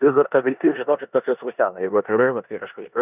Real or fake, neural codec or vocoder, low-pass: fake; codec, 16 kHz in and 24 kHz out, 0.9 kbps, LongCat-Audio-Codec, four codebook decoder; 3.6 kHz